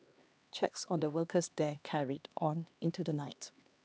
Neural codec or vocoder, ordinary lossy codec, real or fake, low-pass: codec, 16 kHz, 2 kbps, X-Codec, HuBERT features, trained on LibriSpeech; none; fake; none